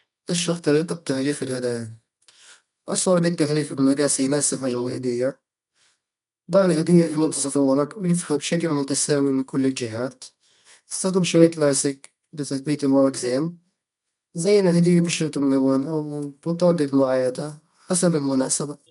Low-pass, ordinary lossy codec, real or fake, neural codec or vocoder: 10.8 kHz; none; fake; codec, 24 kHz, 0.9 kbps, WavTokenizer, medium music audio release